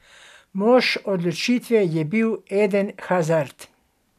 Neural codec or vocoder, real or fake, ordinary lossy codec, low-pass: none; real; none; 14.4 kHz